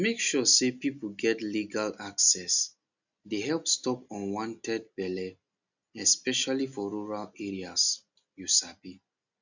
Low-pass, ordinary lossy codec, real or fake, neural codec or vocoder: 7.2 kHz; none; real; none